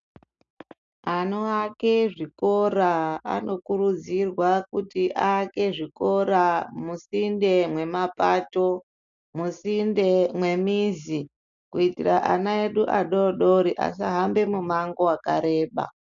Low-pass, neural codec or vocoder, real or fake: 7.2 kHz; none; real